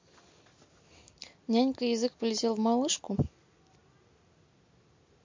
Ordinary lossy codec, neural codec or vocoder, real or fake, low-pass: MP3, 48 kbps; none; real; 7.2 kHz